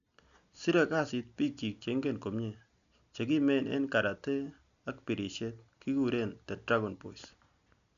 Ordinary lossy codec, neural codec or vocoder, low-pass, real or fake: none; none; 7.2 kHz; real